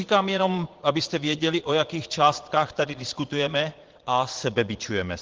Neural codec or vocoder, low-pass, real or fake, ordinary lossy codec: vocoder, 22.05 kHz, 80 mel bands, Vocos; 7.2 kHz; fake; Opus, 16 kbps